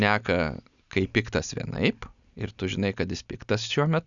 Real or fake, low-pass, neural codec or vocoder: real; 7.2 kHz; none